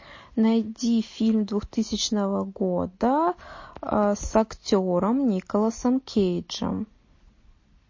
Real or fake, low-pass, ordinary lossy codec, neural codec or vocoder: real; 7.2 kHz; MP3, 32 kbps; none